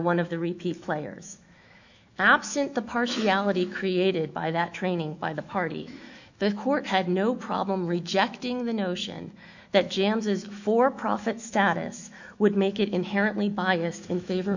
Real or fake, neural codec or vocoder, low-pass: fake; autoencoder, 48 kHz, 128 numbers a frame, DAC-VAE, trained on Japanese speech; 7.2 kHz